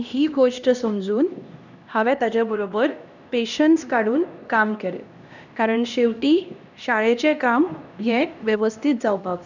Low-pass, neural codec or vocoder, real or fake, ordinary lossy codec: 7.2 kHz; codec, 16 kHz, 1 kbps, X-Codec, HuBERT features, trained on LibriSpeech; fake; none